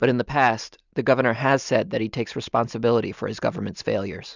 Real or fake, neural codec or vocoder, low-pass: real; none; 7.2 kHz